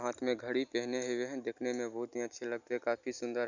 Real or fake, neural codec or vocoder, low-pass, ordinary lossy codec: real; none; 7.2 kHz; none